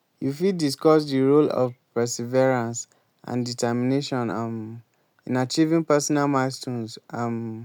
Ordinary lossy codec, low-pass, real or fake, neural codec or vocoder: none; 19.8 kHz; real; none